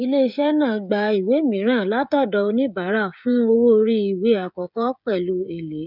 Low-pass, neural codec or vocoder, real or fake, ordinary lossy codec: 5.4 kHz; codec, 16 kHz, 16 kbps, FreqCodec, smaller model; fake; none